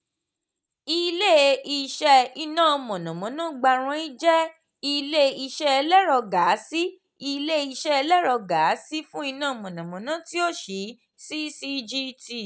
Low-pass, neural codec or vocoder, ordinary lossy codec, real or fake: none; none; none; real